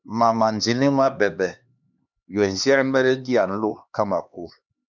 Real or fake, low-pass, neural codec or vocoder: fake; 7.2 kHz; codec, 16 kHz, 2 kbps, X-Codec, HuBERT features, trained on LibriSpeech